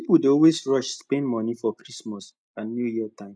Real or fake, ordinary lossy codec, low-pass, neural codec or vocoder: real; none; none; none